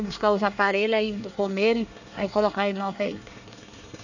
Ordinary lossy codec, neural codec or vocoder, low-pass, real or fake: none; codec, 24 kHz, 1 kbps, SNAC; 7.2 kHz; fake